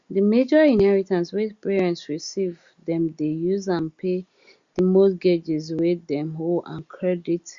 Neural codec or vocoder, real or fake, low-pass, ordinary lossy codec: none; real; 7.2 kHz; Opus, 64 kbps